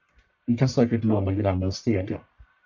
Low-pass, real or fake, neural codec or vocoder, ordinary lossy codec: 7.2 kHz; fake; codec, 44.1 kHz, 1.7 kbps, Pupu-Codec; MP3, 64 kbps